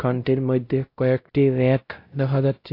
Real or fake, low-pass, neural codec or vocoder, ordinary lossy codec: fake; 5.4 kHz; codec, 16 kHz, 0.5 kbps, X-Codec, WavLM features, trained on Multilingual LibriSpeech; AAC, 32 kbps